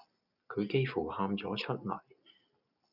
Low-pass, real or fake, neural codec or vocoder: 5.4 kHz; real; none